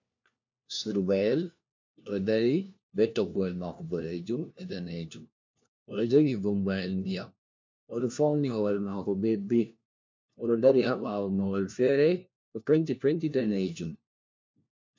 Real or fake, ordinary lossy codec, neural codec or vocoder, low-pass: fake; MP3, 64 kbps; codec, 16 kHz, 1 kbps, FunCodec, trained on LibriTTS, 50 frames a second; 7.2 kHz